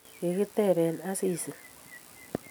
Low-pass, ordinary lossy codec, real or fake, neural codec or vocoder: none; none; real; none